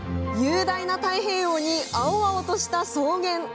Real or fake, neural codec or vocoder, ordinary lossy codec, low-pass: real; none; none; none